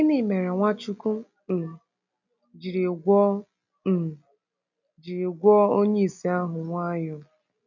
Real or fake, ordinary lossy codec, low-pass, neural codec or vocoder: real; none; 7.2 kHz; none